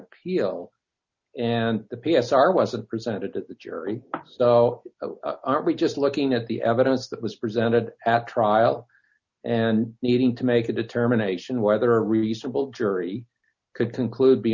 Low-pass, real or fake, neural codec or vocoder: 7.2 kHz; real; none